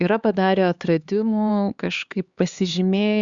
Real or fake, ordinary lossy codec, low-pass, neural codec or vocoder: fake; Opus, 64 kbps; 7.2 kHz; codec, 16 kHz, 4 kbps, X-Codec, HuBERT features, trained on LibriSpeech